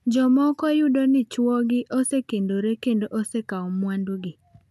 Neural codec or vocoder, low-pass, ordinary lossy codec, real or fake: none; 14.4 kHz; none; real